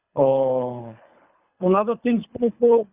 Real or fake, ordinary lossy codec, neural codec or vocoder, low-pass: fake; Opus, 64 kbps; codec, 24 kHz, 3 kbps, HILCodec; 3.6 kHz